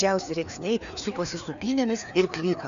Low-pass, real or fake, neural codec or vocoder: 7.2 kHz; fake; codec, 16 kHz, 2 kbps, FreqCodec, larger model